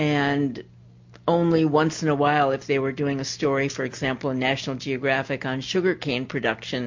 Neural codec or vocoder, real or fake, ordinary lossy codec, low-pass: none; real; MP3, 48 kbps; 7.2 kHz